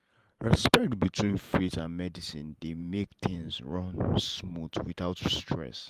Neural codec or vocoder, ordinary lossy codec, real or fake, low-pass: vocoder, 44.1 kHz, 128 mel bands every 512 samples, BigVGAN v2; Opus, 32 kbps; fake; 14.4 kHz